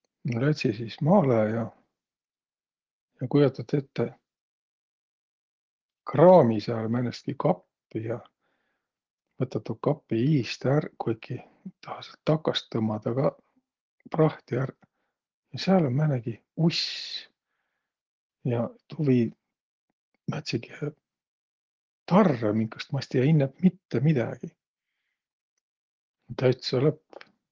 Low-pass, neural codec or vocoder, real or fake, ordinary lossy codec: 7.2 kHz; none; real; Opus, 16 kbps